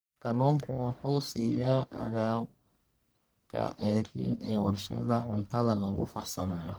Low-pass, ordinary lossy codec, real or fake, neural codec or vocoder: none; none; fake; codec, 44.1 kHz, 1.7 kbps, Pupu-Codec